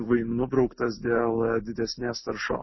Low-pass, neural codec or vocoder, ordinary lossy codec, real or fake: 7.2 kHz; none; MP3, 24 kbps; real